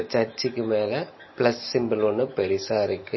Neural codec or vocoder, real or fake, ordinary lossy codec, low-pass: none; real; MP3, 24 kbps; 7.2 kHz